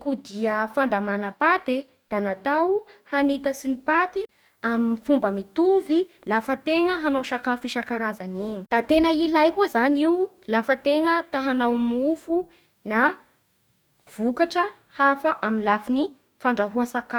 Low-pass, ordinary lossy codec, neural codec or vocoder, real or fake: none; none; codec, 44.1 kHz, 2.6 kbps, DAC; fake